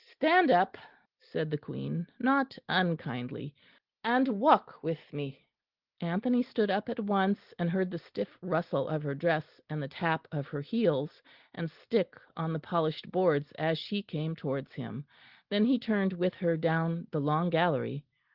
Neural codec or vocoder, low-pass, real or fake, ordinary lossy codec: none; 5.4 kHz; real; Opus, 16 kbps